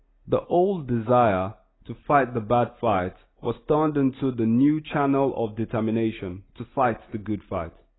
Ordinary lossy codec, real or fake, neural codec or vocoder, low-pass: AAC, 16 kbps; real; none; 7.2 kHz